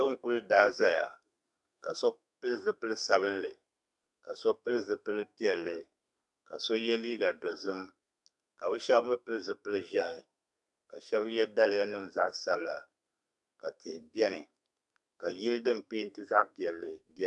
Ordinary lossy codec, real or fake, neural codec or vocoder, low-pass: MP3, 96 kbps; fake; codec, 32 kHz, 1.9 kbps, SNAC; 10.8 kHz